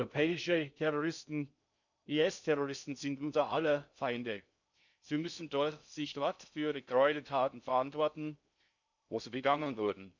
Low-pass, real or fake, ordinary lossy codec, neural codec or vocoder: 7.2 kHz; fake; none; codec, 16 kHz in and 24 kHz out, 0.6 kbps, FocalCodec, streaming, 2048 codes